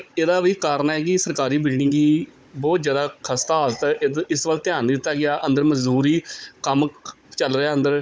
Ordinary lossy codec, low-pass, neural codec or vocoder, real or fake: none; none; codec, 16 kHz, 16 kbps, FunCodec, trained on Chinese and English, 50 frames a second; fake